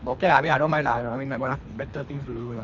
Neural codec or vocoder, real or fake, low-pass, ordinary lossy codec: codec, 24 kHz, 3 kbps, HILCodec; fake; 7.2 kHz; none